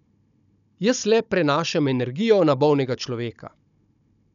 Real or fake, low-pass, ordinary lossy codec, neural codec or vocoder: fake; 7.2 kHz; none; codec, 16 kHz, 16 kbps, FunCodec, trained on Chinese and English, 50 frames a second